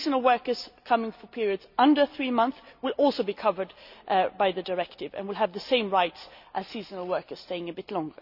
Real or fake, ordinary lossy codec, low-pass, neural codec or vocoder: real; none; 5.4 kHz; none